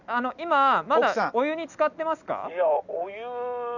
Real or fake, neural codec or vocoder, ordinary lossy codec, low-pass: real; none; none; 7.2 kHz